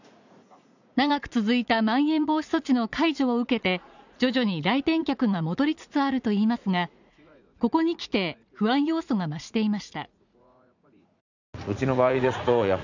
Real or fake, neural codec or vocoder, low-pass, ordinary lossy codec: fake; vocoder, 44.1 kHz, 80 mel bands, Vocos; 7.2 kHz; none